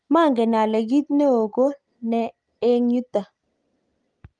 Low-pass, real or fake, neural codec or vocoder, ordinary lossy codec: 9.9 kHz; real; none; Opus, 32 kbps